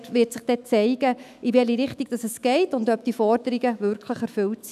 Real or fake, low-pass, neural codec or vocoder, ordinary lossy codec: fake; 14.4 kHz; autoencoder, 48 kHz, 128 numbers a frame, DAC-VAE, trained on Japanese speech; none